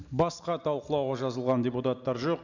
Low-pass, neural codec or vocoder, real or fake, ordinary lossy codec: 7.2 kHz; none; real; none